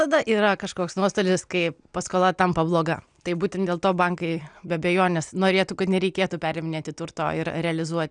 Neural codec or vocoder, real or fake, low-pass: none; real; 9.9 kHz